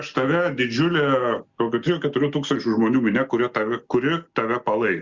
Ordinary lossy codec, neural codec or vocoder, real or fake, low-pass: Opus, 64 kbps; none; real; 7.2 kHz